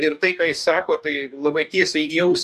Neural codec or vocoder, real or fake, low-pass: codec, 44.1 kHz, 2.6 kbps, DAC; fake; 14.4 kHz